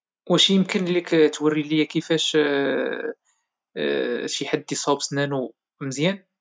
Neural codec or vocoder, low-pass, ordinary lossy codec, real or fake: none; none; none; real